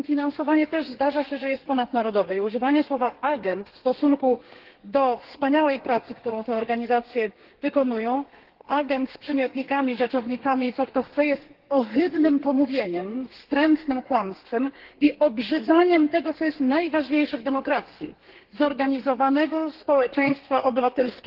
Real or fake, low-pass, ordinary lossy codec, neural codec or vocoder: fake; 5.4 kHz; Opus, 16 kbps; codec, 32 kHz, 1.9 kbps, SNAC